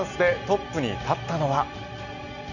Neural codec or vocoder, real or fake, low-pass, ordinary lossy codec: none; real; 7.2 kHz; none